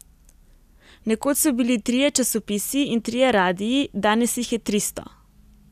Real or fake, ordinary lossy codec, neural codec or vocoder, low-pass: real; none; none; 14.4 kHz